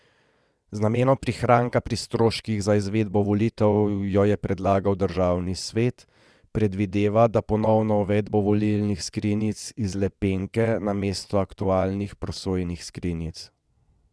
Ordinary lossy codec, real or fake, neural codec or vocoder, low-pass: none; fake; vocoder, 22.05 kHz, 80 mel bands, WaveNeXt; none